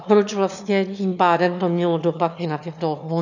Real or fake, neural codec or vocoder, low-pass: fake; autoencoder, 22.05 kHz, a latent of 192 numbers a frame, VITS, trained on one speaker; 7.2 kHz